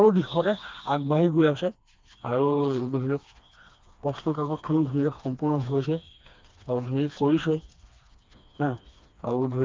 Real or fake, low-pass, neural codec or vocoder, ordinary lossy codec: fake; 7.2 kHz; codec, 16 kHz, 2 kbps, FreqCodec, smaller model; Opus, 24 kbps